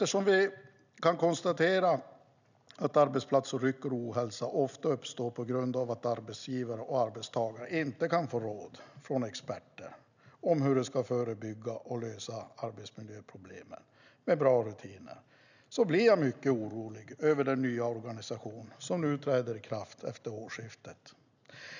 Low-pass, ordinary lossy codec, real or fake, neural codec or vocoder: 7.2 kHz; none; real; none